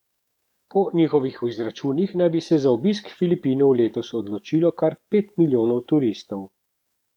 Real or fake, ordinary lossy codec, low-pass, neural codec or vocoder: fake; none; 19.8 kHz; codec, 44.1 kHz, 7.8 kbps, DAC